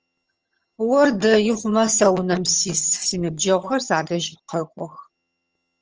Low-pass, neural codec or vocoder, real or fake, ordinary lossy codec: 7.2 kHz; vocoder, 22.05 kHz, 80 mel bands, HiFi-GAN; fake; Opus, 24 kbps